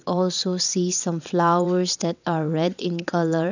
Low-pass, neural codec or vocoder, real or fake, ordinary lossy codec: 7.2 kHz; vocoder, 22.05 kHz, 80 mel bands, WaveNeXt; fake; none